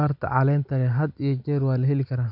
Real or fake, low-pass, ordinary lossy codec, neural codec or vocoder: real; 5.4 kHz; none; none